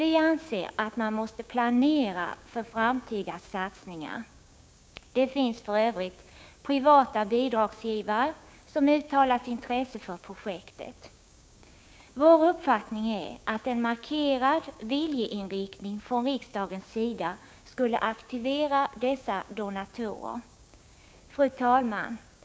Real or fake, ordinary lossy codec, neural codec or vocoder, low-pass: fake; none; codec, 16 kHz, 6 kbps, DAC; none